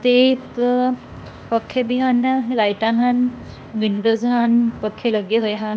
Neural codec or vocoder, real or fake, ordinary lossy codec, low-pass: codec, 16 kHz, 0.8 kbps, ZipCodec; fake; none; none